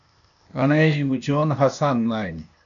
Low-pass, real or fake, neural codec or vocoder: 7.2 kHz; fake; codec, 16 kHz, 0.8 kbps, ZipCodec